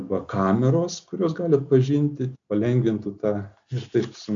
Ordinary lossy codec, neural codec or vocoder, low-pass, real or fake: AAC, 64 kbps; none; 7.2 kHz; real